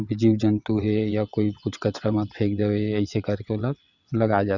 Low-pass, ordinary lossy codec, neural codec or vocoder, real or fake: 7.2 kHz; none; vocoder, 22.05 kHz, 80 mel bands, WaveNeXt; fake